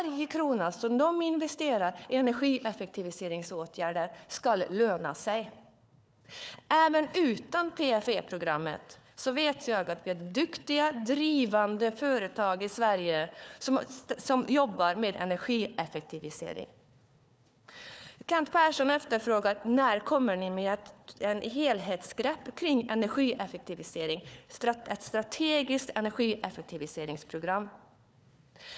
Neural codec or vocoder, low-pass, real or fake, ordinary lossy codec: codec, 16 kHz, 4 kbps, FunCodec, trained on Chinese and English, 50 frames a second; none; fake; none